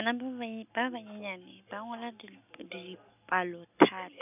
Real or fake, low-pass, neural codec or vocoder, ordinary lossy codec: real; 3.6 kHz; none; none